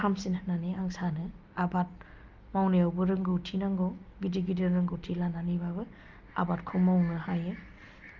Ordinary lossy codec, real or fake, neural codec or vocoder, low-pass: Opus, 32 kbps; real; none; 7.2 kHz